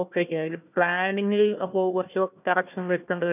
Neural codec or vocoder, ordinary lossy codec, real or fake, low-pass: codec, 16 kHz, 1 kbps, FunCodec, trained on Chinese and English, 50 frames a second; none; fake; 3.6 kHz